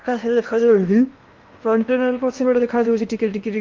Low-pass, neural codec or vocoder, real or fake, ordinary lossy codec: 7.2 kHz; codec, 16 kHz in and 24 kHz out, 0.8 kbps, FocalCodec, streaming, 65536 codes; fake; Opus, 24 kbps